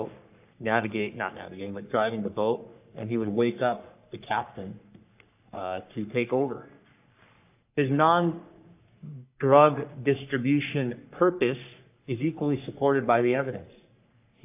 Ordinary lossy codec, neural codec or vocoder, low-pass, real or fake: AAC, 32 kbps; codec, 44.1 kHz, 3.4 kbps, Pupu-Codec; 3.6 kHz; fake